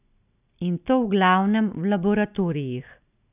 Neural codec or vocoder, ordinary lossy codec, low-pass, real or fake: none; none; 3.6 kHz; real